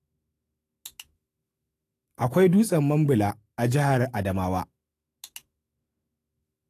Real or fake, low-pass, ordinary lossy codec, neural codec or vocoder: fake; 14.4 kHz; AAC, 48 kbps; autoencoder, 48 kHz, 128 numbers a frame, DAC-VAE, trained on Japanese speech